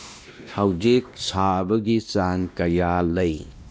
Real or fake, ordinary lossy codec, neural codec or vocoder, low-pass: fake; none; codec, 16 kHz, 1 kbps, X-Codec, WavLM features, trained on Multilingual LibriSpeech; none